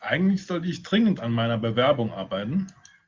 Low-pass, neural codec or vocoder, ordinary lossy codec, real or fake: 7.2 kHz; none; Opus, 32 kbps; real